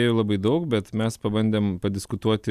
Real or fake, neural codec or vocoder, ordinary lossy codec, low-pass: real; none; AAC, 96 kbps; 14.4 kHz